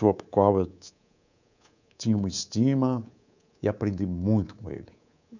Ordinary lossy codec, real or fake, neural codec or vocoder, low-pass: none; fake; codec, 24 kHz, 3.1 kbps, DualCodec; 7.2 kHz